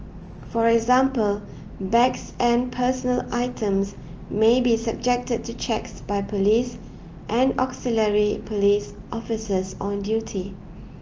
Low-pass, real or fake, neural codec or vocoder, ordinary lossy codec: 7.2 kHz; real; none; Opus, 24 kbps